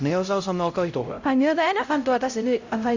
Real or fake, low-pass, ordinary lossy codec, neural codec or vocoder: fake; 7.2 kHz; AAC, 48 kbps; codec, 16 kHz, 0.5 kbps, X-Codec, HuBERT features, trained on LibriSpeech